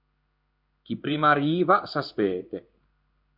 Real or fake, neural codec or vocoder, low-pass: fake; codec, 16 kHz in and 24 kHz out, 1 kbps, XY-Tokenizer; 5.4 kHz